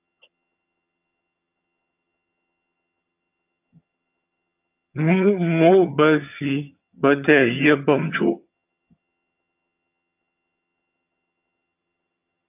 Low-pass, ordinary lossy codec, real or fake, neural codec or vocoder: 3.6 kHz; AAC, 32 kbps; fake; vocoder, 22.05 kHz, 80 mel bands, HiFi-GAN